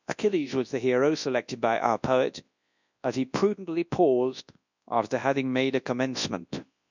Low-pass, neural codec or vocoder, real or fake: 7.2 kHz; codec, 24 kHz, 0.9 kbps, WavTokenizer, large speech release; fake